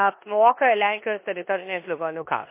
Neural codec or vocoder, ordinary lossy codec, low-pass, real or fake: codec, 16 kHz in and 24 kHz out, 0.9 kbps, LongCat-Audio-Codec, four codebook decoder; MP3, 24 kbps; 3.6 kHz; fake